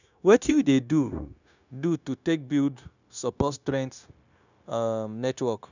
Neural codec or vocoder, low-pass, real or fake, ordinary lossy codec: codec, 16 kHz, 0.9 kbps, LongCat-Audio-Codec; 7.2 kHz; fake; none